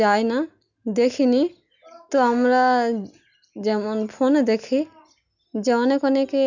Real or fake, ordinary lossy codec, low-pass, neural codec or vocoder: real; none; 7.2 kHz; none